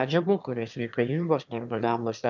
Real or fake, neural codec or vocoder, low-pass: fake; autoencoder, 22.05 kHz, a latent of 192 numbers a frame, VITS, trained on one speaker; 7.2 kHz